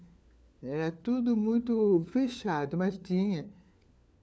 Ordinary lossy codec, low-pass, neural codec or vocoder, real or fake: none; none; codec, 16 kHz, 4 kbps, FunCodec, trained on LibriTTS, 50 frames a second; fake